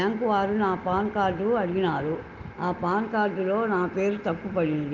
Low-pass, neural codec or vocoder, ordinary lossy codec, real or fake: 7.2 kHz; none; Opus, 24 kbps; real